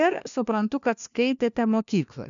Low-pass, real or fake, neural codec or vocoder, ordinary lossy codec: 7.2 kHz; fake; codec, 16 kHz, 1 kbps, FunCodec, trained on LibriTTS, 50 frames a second; MP3, 96 kbps